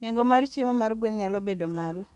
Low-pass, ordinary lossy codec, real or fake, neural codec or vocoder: 10.8 kHz; none; fake; codec, 32 kHz, 1.9 kbps, SNAC